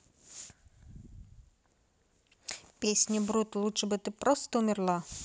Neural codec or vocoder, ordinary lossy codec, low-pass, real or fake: none; none; none; real